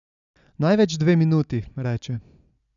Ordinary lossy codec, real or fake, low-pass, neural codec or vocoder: none; real; 7.2 kHz; none